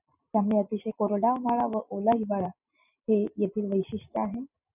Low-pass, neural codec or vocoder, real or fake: 3.6 kHz; none; real